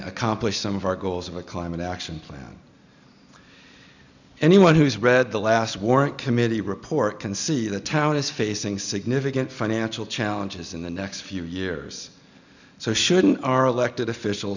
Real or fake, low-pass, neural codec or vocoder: real; 7.2 kHz; none